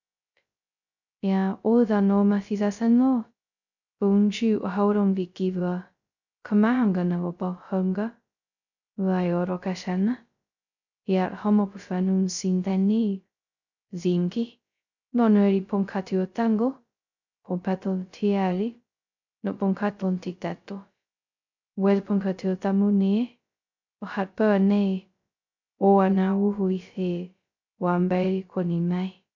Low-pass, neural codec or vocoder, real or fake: 7.2 kHz; codec, 16 kHz, 0.2 kbps, FocalCodec; fake